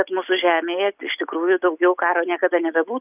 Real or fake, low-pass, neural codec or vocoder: real; 3.6 kHz; none